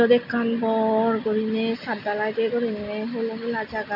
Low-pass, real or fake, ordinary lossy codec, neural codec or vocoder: 5.4 kHz; real; none; none